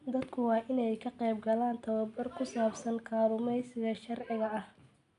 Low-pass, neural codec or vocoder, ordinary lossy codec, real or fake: 10.8 kHz; none; none; real